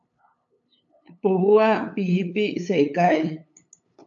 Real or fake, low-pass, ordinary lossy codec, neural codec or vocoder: fake; 7.2 kHz; AAC, 64 kbps; codec, 16 kHz, 8 kbps, FunCodec, trained on LibriTTS, 25 frames a second